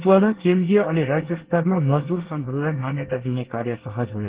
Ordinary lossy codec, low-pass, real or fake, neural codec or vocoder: Opus, 16 kbps; 3.6 kHz; fake; codec, 24 kHz, 1 kbps, SNAC